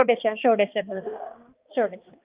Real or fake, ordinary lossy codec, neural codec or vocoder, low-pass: fake; Opus, 24 kbps; codec, 16 kHz, 2 kbps, X-Codec, HuBERT features, trained on balanced general audio; 3.6 kHz